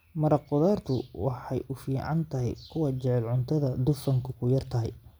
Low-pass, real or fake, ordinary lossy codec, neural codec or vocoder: none; real; none; none